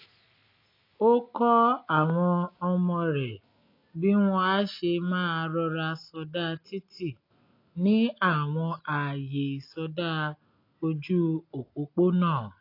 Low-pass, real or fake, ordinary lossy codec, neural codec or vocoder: 5.4 kHz; real; AAC, 32 kbps; none